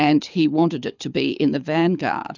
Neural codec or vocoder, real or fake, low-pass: codec, 24 kHz, 6 kbps, HILCodec; fake; 7.2 kHz